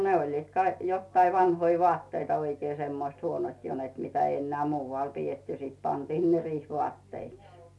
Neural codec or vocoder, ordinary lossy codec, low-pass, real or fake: none; none; none; real